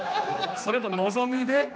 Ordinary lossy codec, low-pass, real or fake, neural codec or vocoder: none; none; fake; codec, 16 kHz, 2 kbps, X-Codec, HuBERT features, trained on general audio